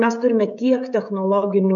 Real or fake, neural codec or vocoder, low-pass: fake; codec, 16 kHz, 16 kbps, FreqCodec, smaller model; 7.2 kHz